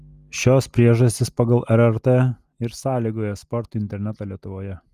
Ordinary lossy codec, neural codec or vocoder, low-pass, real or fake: Opus, 32 kbps; none; 14.4 kHz; real